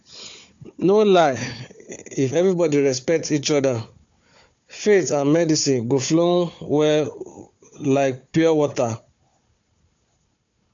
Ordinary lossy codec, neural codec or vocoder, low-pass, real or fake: AAC, 64 kbps; codec, 16 kHz, 4 kbps, FunCodec, trained on Chinese and English, 50 frames a second; 7.2 kHz; fake